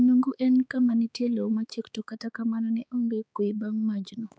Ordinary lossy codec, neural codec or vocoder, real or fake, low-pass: none; codec, 16 kHz, 4 kbps, X-Codec, HuBERT features, trained on balanced general audio; fake; none